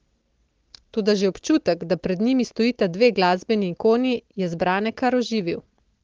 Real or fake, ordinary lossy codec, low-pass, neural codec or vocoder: real; Opus, 16 kbps; 7.2 kHz; none